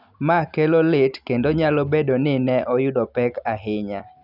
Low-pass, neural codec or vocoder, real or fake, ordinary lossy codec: 5.4 kHz; none; real; none